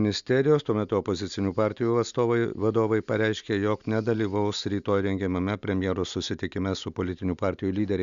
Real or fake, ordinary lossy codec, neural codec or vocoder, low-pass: real; Opus, 64 kbps; none; 7.2 kHz